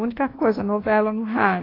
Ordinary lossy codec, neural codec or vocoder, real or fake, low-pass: AAC, 24 kbps; codec, 24 kHz, 1.2 kbps, DualCodec; fake; 5.4 kHz